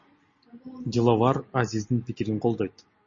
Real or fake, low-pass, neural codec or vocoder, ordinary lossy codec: real; 7.2 kHz; none; MP3, 32 kbps